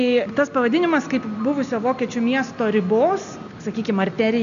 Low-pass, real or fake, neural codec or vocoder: 7.2 kHz; real; none